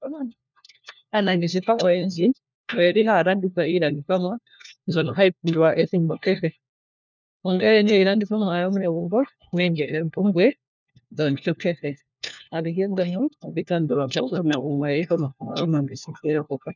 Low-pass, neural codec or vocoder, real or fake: 7.2 kHz; codec, 16 kHz, 1 kbps, FunCodec, trained on LibriTTS, 50 frames a second; fake